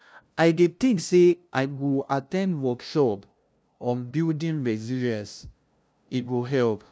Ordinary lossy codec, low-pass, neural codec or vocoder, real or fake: none; none; codec, 16 kHz, 0.5 kbps, FunCodec, trained on LibriTTS, 25 frames a second; fake